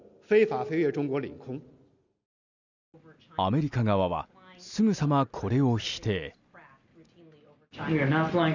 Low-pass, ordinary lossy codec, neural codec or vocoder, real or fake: 7.2 kHz; none; none; real